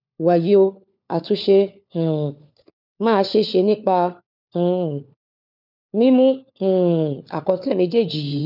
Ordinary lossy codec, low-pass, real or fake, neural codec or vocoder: none; 5.4 kHz; fake; codec, 16 kHz, 4 kbps, FunCodec, trained on LibriTTS, 50 frames a second